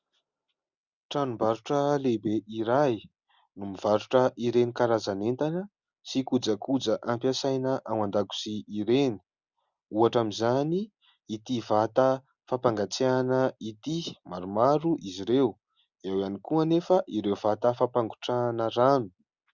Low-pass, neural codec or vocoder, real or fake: 7.2 kHz; none; real